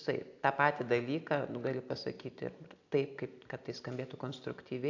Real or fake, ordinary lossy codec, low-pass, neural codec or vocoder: real; AAC, 48 kbps; 7.2 kHz; none